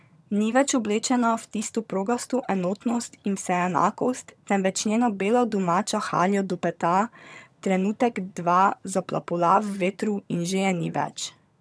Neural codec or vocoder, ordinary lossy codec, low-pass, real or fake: vocoder, 22.05 kHz, 80 mel bands, HiFi-GAN; none; none; fake